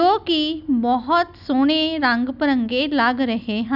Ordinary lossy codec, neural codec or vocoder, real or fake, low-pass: none; none; real; 5.4 kHz